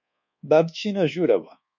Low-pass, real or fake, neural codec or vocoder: 7.2 kHz; fake; codec, 24 kHz, 1.2 kbps, DualCodec